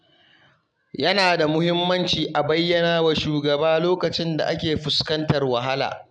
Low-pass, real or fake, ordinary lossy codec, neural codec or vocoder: 9.9 kHz; real; none; none